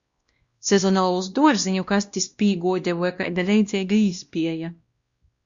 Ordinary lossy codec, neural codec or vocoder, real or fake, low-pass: Opus, 64 kbps; codec, 16 kHz, 1 kbps, X-Codec, WavLM features, trained on Multilingual LibriSpeech; fake; 7.2 kHz